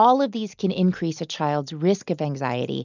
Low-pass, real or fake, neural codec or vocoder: 7.2 kHz; real; none